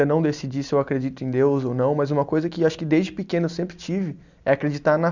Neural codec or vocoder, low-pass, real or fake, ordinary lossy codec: none; 7.2 kHz; real; none